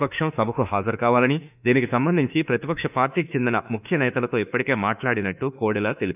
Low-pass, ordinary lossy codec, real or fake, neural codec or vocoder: 3.6 kHz; none; fake; autoencoder, 48 kHz, 32 numbers a frame, DAC-VAE, trained on Japanese speech